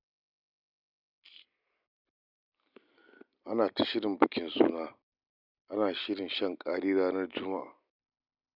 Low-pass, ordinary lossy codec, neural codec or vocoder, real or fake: 5.4 kHz; none; none; real